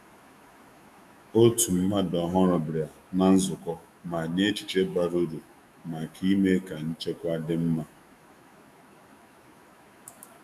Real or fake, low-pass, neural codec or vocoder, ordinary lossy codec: fake; 14.4 kHz; autoencoder, 48 kHz, 128 numbers a frame, DAC-VAE, trained on Japanese speech; none